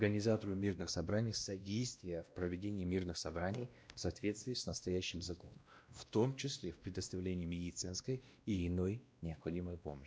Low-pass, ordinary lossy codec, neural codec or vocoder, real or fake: none; none; codec, 16 kHz, 1 kbps, X-Codec, WavLM features, trained on Multilingual LibriSpeech; fake